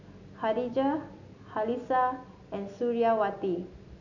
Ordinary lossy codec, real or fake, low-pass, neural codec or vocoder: none; real; 7.2 kHz; none